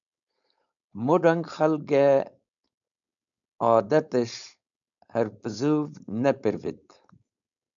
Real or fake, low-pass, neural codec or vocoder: fake; 7.2 kHz; codec, 16 kHz, 4.8 kbps, FACodec